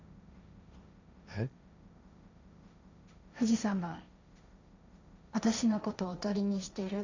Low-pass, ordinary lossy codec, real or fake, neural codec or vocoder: 7.2 kHz; none; fake; codec, 16 kHz, 1.1 kbps, Voila-Tokenizer